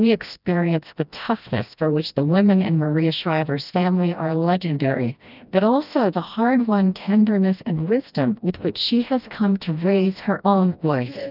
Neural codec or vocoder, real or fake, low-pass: codec, 16 kHz, 1 kbps, FreqCodec, smaller model; fake; 5.4 kHz